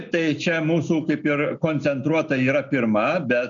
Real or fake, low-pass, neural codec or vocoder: real; 7.2 kHz; none